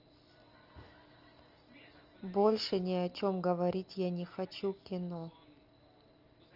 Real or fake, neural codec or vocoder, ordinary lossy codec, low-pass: real; none; Opus, 24 kbps; 5.4 kHz